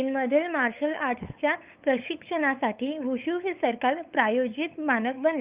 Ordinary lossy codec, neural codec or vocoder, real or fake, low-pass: Opus, 24 kbps; codec, 16 kHz, 8 kbps, FunCodec, trained on LibriTTS, 25 frames a second; fake; 3.6 kHz